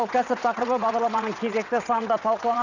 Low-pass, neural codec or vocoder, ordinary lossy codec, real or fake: 7.2 kHz; vocoder, 22.05 kHz, 80 mel bands, WaveNeXt; none; fake